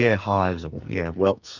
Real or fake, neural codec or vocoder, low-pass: fake; codec, 44.1 kHz, 2.6 kbps, SNAC; 7.2 kHz